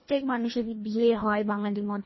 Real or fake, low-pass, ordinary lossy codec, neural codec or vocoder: fake; 7.2 kHz; MP3, 24 kbps; codec, 24 kHz, 1.5 kbps, HILCodec